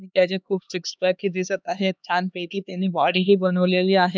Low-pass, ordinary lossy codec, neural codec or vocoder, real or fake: none; none; codec, 16 kHz, 2 kbps, X-Codec, HuBERT features, trained on LibriSpeech; fake